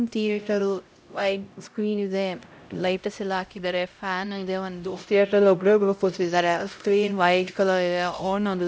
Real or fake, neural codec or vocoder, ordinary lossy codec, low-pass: fake; codec, 16 kHz, 0.5 kbps, X-Codec, HuBERT features, trained on LibriSpeech; none; none